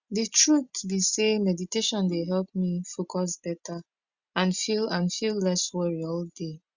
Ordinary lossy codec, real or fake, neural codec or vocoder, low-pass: Opus, 64 kbps; real; none; 7.2 kHz